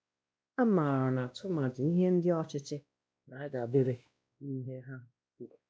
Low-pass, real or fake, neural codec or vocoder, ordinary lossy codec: none; fake; codec, 16 kHz, 1 kbps, X-Codec, WavLM features, trained on Multilingual LibriSpeech; none